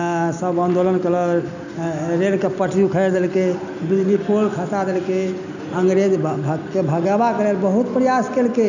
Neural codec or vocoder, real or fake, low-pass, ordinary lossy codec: none; real; 7.2 kHz; none